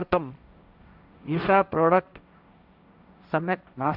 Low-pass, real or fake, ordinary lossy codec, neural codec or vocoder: 5.4 kHz; fake; none; codec, 16 kHz, 1.1 kbps, Voila-Tokenizer